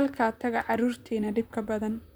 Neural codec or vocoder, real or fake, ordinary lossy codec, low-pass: vocoder, 44.1 kHz, 128 mel bands, Pupu-Vocoder; fake; none; none